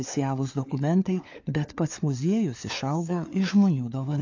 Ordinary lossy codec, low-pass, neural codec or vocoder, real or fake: AAC, 48 kbps; 7.2 kHz; codec, 16 kHz, 4 kbps, FunCodec, trained on LibriTTS, 50 frames a second; fake